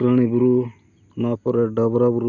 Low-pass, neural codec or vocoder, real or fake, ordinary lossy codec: 7.2 kHz; none; real; none